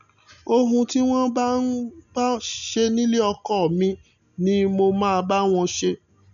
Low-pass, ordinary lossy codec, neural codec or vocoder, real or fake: 7.2 kHz; MP3, 96 kbps; none; real